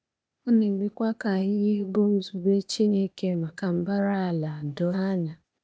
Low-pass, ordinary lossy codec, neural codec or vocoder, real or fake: none; none; codec, 16 kHz, 0.8 kbps, ZipCodec; fake